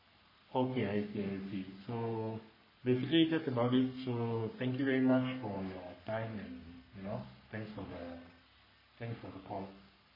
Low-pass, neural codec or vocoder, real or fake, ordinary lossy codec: 5.4 kHz; codec, 44.1 kHz, 3.4 kbps, Pupu-Codec; fake; MP3, 24 kbps